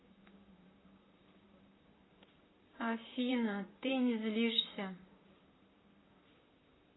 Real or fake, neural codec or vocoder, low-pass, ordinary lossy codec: fake; vocoder, 44.1 kHz, 128 mel bands, Pupu-Vocoder; 7.2 kHz; AAC, 16 kbps